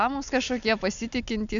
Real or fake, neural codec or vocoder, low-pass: real; none; 7.2 kHz